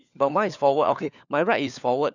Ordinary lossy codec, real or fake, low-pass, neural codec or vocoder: none; fake; 7.2 kHz; codec, 16 kHz, 4 kbps, FunCodec, trained on LibriTTS, 50 frames a second